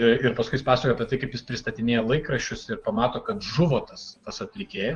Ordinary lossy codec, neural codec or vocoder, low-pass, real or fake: Opus, 24 kbps; vocoder, 24 kHz, 100 mel bands, Vocos; 10.8 kHz; fake